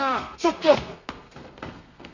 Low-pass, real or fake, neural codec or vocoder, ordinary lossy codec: 7.2 kHz; fake; codec, 32 kHz, 1.9 kbps, SNAC; none